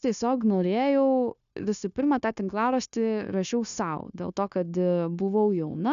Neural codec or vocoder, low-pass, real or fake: codec, 16 kHz, 0.9 kbps, LongCat-Audio-Codec; 7.2 kHz; fake